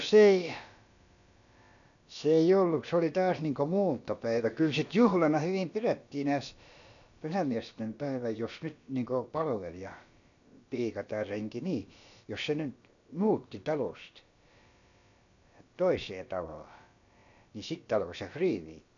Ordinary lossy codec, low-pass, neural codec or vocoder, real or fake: none; 7.2 kHz; codec, 16 kHz, about 1 kbps, DyCAST, with the encoder's durations; fake